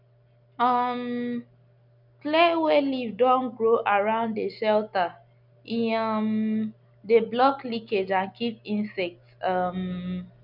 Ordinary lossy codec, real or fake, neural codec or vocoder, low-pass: none; real; none; 5.4 kHz